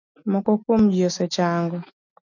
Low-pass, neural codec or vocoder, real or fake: 7.2 kHz; none; real